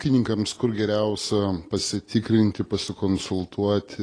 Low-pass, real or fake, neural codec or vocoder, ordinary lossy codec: 9.9 kHz; real; none; AAC, 32 kbps